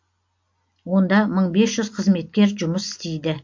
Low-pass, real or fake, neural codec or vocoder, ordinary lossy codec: 7.2 kHz; real; none; MP3, 48 kbps